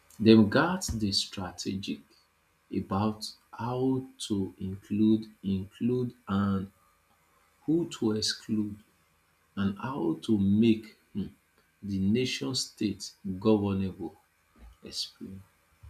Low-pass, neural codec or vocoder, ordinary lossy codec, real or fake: 14.4 kHz; none; none; real